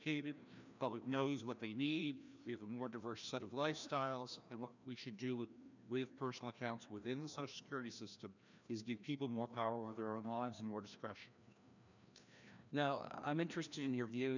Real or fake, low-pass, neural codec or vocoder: fake; 7.2 kHz; codec, 16 kHz, 1 kbps, FreqCodec, larger model